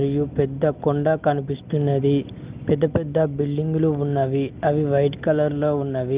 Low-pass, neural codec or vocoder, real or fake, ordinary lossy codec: 3.6 kHz; none; real; Opus, 32 kbps